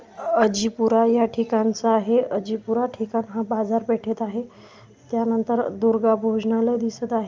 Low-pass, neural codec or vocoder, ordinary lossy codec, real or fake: 7.2 kHz; none; Opus, 24 kbps; real